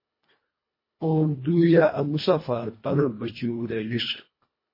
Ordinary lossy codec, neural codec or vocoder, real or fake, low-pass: MP3, 24 kbps; codec, 24 kHz, 1.5 kbps, HILCodec; fake; 5.4 kHz